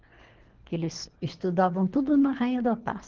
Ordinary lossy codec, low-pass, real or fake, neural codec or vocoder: Opus, 16 kbps; 7.2 kHz; fake; codec, 24 kHz, 3 kbps, HILCodec